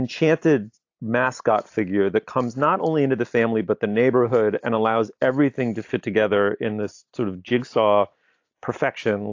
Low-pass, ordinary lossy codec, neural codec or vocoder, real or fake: 7.2 kHz; AAC, 48 kbps; none; real